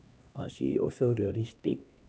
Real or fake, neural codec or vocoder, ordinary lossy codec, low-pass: fake; codec, 16 kHz, 1 kbps, X-Codec, HuBERT features, trained on LibriSpeech; none; none